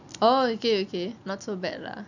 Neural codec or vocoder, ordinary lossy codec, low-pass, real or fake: none; none; 7.2 kHz; real